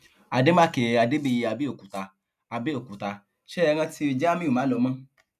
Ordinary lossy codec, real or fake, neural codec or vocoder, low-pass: none; real; none; 14.4 kHz